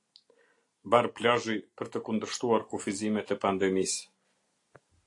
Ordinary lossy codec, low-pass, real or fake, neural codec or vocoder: AAC, 48 kbps; 10.8 kHz; real; none